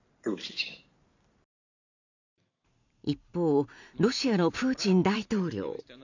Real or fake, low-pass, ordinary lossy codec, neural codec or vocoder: fake; 7.2 kHz; none; vocoder, 44.1 kHz, 128 mel bands every 512 samples, BigVGAN v2